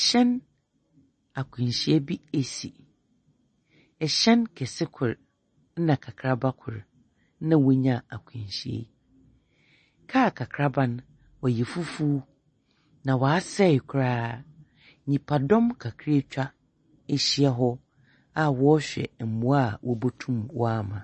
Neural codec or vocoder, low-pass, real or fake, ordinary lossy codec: none; 10.8 kHz; real; MP3, 32 kbps